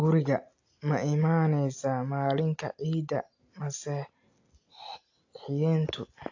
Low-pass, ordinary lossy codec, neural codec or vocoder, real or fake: 7.2 kHz; AAC, 48 kbps; none; real